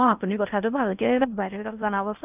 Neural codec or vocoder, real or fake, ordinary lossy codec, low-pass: codec, 16 kHz in and 24 kHz out, 0.6 kbps, FocalCodec, streaming, 4096 codes; fake; none; 3.6 kHz